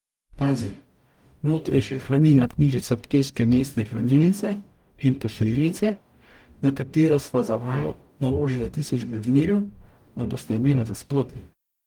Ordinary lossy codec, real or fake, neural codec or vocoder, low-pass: Opus, 32 kbps; fake; codec, 44.1 kHz, 0.9 kbps, DAC; 19.8 kHz